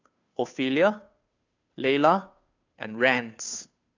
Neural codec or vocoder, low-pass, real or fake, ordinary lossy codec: codec, 16 kHz, 2 kbps, FunCodec, trained on Chinese and English, 25 frames a second; 7.2 kHz; fake; AAC, 48 kbps